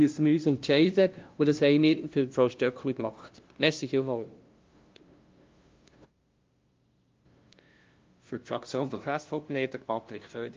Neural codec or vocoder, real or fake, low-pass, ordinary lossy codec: codec, 16 kHz, 0.5 kbps, FunCodec, trained on LibriTTS, 25 frames a second; fake; 7.2 kHz; Opus, 32 kbps